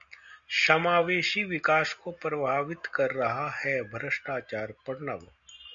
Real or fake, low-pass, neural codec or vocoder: real; 7.2 kHz; none